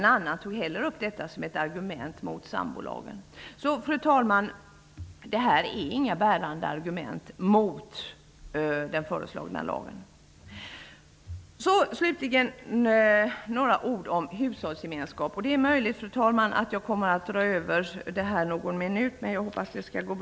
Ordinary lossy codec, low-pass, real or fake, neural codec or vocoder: none; none; real; none